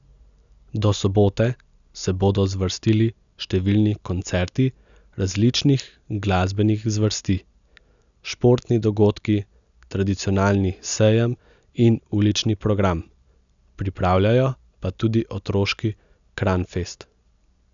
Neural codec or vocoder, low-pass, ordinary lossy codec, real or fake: none; 7.2 kHz; none; real